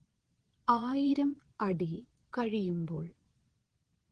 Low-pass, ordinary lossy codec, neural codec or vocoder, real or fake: 9.9 kHz; Opus, 16 kbps; vocoder, 22.05 kHz, 80 mel bands, Vocos; fake